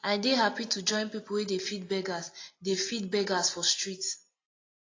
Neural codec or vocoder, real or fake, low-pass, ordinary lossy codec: none; real; 7.2 kHz; AAC, 32 kbps